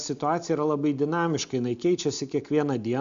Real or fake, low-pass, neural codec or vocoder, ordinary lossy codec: real; 7.2 kHz; none; MP3, 64 kbps